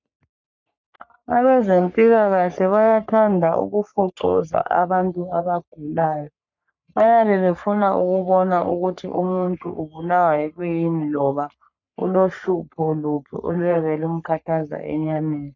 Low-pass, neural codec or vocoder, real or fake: 7.2 kHz; codec, 44.1 kHz, 3.4 kbps, Pupu-Codec; fake